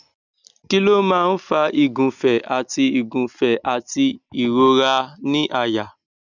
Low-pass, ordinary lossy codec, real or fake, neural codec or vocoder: 7.2 kHz; none; real; none